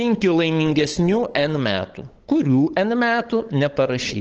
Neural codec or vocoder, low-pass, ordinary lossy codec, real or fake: codec, 16 kHz, 8 kbps, FunCodec, trained on LibriTTS, 25 frames a second; 7.2 kHz; Opus, 16 kbps; fake